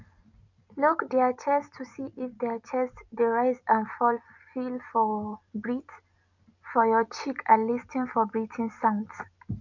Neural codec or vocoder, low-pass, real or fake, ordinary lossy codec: none; 7.2 kHz; real; none